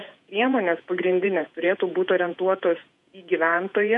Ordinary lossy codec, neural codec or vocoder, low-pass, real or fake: MP3, 64 kbps; vocoder, 48 kHz, 128 mel bands, Vocos; 10.8 kHz; fake